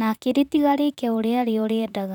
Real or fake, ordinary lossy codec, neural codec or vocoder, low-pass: fake; none; vocoder, 44.1 kHz, 128 mel bands, Pupu-Vocoder; 19.8 kHz